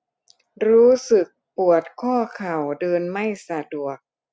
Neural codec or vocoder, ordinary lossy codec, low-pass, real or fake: none; none; none; real